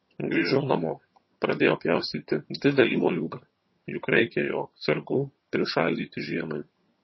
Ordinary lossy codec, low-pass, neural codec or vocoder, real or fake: MP3, 24 kbps; 7.2 kHz; vocoder, 22.05 kHz, 80 mel bands, HiFi-GAN; fake